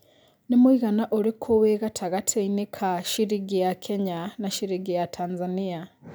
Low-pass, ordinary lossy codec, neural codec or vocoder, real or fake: none; none; none; real